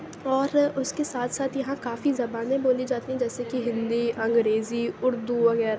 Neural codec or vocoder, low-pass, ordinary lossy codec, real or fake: none; none; none; real